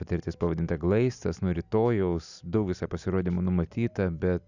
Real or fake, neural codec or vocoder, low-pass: real; none; 7.2 kHz